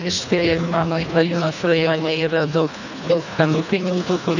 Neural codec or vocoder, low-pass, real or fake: codec, 24 kHz, 1.5 kbps, HILCodec; 7.2 kHz; fake